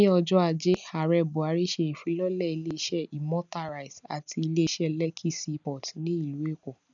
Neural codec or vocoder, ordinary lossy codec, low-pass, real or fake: none; none; 7.2 kHz; real